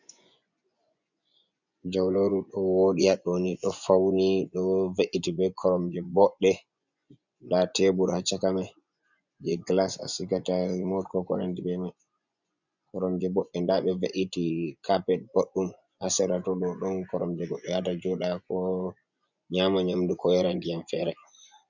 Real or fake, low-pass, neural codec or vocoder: fake; 7.2 kHz; vocoder, 24 kHz, 100 mel bands, Vocos